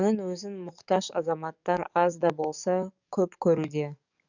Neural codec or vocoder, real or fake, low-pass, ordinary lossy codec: codec, 44.1 kHz, 7.8 kbps, DAC; fake; 7.2 kHz; none